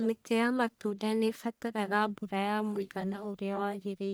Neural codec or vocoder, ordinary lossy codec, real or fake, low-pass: codec, 44.1 kHz, 1.7 kbps, Pupu-Codec; none; fake; none